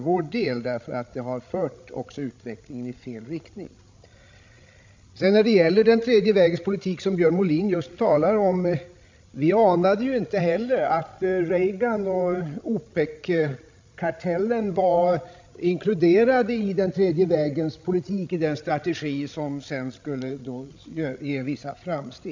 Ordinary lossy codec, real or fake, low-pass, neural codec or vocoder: MP3, 64 kbps; fake; 7.2 kHz; codec, 16 kHz, 16 kbps, FreqCodec, larger model